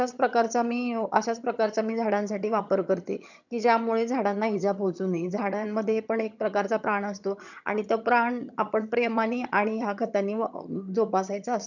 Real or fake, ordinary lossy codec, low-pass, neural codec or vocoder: fake; none; 7.2 kHz; vocoder, 22.05 kHz, 80 mel bands, HiFi-GAN